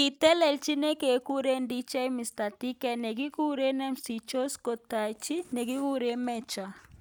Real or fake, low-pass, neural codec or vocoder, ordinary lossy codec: real; none; none; none